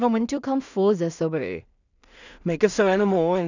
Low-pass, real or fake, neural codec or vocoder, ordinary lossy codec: 7.2 kHz; fake; codec, 16 kHz in and 24 kHz out, 0.4 kbps, LongCat-Audio-Codec, two codebook decoder; none